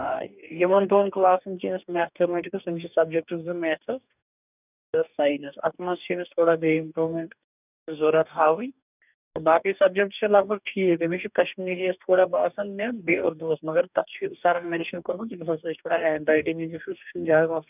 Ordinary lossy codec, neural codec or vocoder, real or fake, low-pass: none; codec, 44.1 kHz, 2.6 kbps, DAC; fake; 3.6 kHz